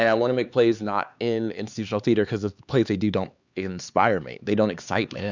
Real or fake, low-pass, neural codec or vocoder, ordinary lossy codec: fake; 7.2 kHz; codec, 16 kHz, 4 kbps, X-Codec, HuBERT features, trained on LibriSpeech; Opus, 64 kbps